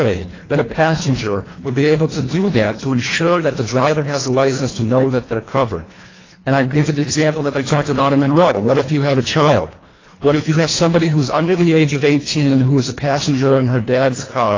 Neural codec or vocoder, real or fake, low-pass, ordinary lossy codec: codec, 24 kHz, 1.5 kbps, HILCodec; fake; 7.2 kHz; AAC, 32 kbps